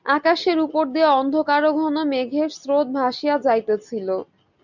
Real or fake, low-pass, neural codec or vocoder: real; 7.2 kHz; none